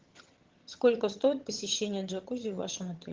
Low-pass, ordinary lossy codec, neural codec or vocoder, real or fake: 7.2 kHz; Opus, 16 kbps; vocoder, 22.05 kHz, 80 mel bands, HiFi-GAN; fake